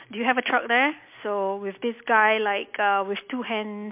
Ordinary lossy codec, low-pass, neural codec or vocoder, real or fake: MP3, 32 kbps; 3.6 kHz; none; real